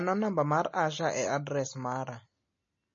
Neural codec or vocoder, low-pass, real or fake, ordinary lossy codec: none; 7.2 kHz; real; MP3, 32 kbps